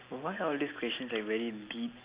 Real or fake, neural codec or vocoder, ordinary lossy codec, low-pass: real; none; Opus, 64 kbps; 3.6 kHz